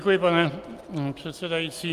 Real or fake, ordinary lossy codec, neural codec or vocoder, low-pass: real; Opus, 32 kbps; none; 14.4 kHz